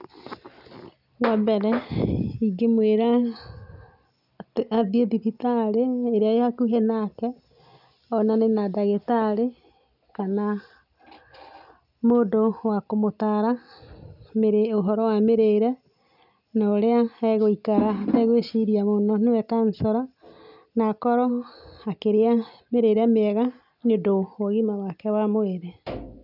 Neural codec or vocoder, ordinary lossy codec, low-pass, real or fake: none; none; 5.4 kHz; real